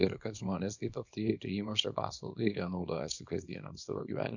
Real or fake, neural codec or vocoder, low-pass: fake; codec, 24 kHz, 0.9 kbps, WavTokenizer, small release; 7.2 kHz